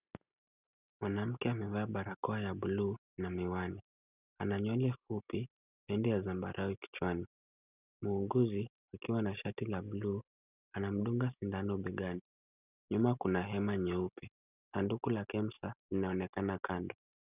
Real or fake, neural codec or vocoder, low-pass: real; none; 3.6 kHz